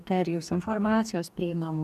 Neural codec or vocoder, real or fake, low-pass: codec, 44.1 kHz, 2.6 kbps, DAC; fake; 14.4 kHz